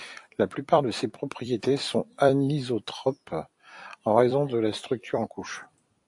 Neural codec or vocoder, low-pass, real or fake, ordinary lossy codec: vocoder, 44.1 kHz, 128 mel bands every 256 samples, BigVGAN v2; 10.8 kHz; fake; AAC, 64 kbps